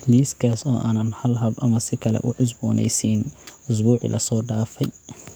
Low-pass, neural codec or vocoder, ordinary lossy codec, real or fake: none; codec, 44.1 kHz, 7.8 kbps, DAC; none; fake